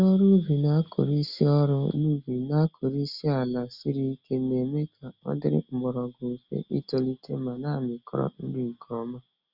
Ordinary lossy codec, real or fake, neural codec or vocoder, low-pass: Opus, 64 kbps; real; none; 5.4 kHz